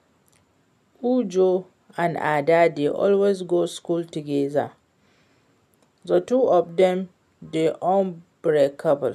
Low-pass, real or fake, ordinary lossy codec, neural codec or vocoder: 14.4 kHz; real; none; none